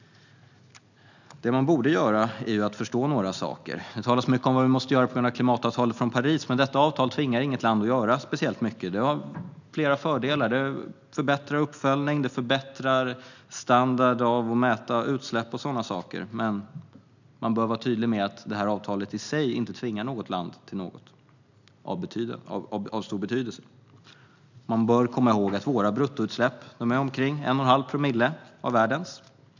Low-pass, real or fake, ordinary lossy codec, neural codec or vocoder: 7.2 kHz; real; none; none